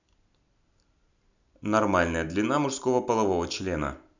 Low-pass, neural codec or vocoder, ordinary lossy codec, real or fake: 7.2 kHz; none; none; real